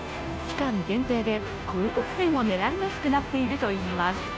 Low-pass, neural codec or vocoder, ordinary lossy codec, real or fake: none; codec, 16 kHz, 0.5 kbps, FunCodec, trained on Chinese and English, 25 frames a second; none; fake